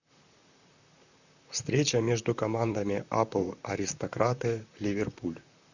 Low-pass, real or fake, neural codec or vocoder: 7.2 kHz; fake; vocoder, 44.1 kHz, 128 mel bands, Pupu-Vocoder